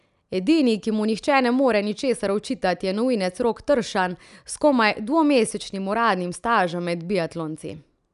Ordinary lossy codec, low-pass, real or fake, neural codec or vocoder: none; 10.8 kHz; real; none